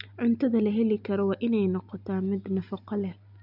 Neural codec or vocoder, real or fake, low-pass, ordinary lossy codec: none; real; 5.4 kHz; none